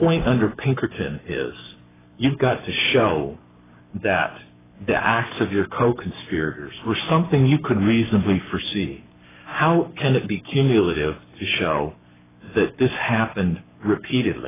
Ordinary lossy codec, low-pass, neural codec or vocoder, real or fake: AAC, 16 kbps; 3.6 kHz; codec, 44.1 kHz, 7.8 kbps, Pupu-Codec; fake